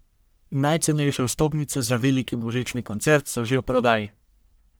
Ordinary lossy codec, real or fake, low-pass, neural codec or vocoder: none; fake; none; codec, 44.1 kHz, 1.7 kbps, Pupu-Codec